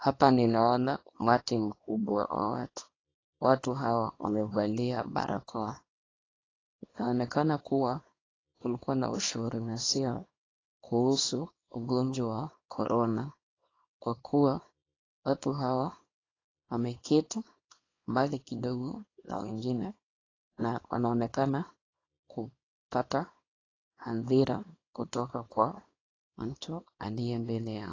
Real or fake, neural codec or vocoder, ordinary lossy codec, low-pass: fake; codec, 24 kHz, 0.9 kbps, WavTokenizer, small release; AAC, 32 kbps; 7.2 kHz